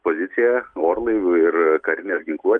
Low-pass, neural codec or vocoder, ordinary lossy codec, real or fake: 9.9 kHz; none; Opus, 32 kbps; real